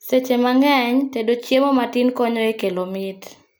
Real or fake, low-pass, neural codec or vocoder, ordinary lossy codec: real; none; none; none